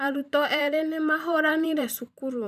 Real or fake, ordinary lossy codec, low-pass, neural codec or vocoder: fake; none; 14.4 kHz; vocoder, 44.1 kHz, 128 mel bands every 512 samples, BigVGAN v2